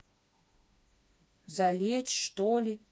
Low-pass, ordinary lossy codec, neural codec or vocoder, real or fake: none; none; codec, 16 kHz, 2 kbps, FreqCodec, smaller model; fake